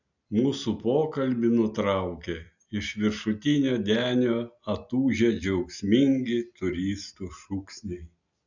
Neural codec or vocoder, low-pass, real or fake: none; 7.2 kHz; real